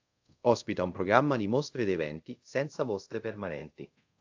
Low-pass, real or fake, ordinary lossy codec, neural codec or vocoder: 7.2 kHz; fake; AAC, 48 kbps; codec, 24 kHz, 0.5 kbps, DualCodec